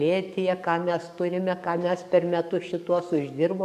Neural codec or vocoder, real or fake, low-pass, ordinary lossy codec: codec, 44.1 kHz, 7.8 kbps, DAC; fake; 14.4 kHz; MP3, 96 kbps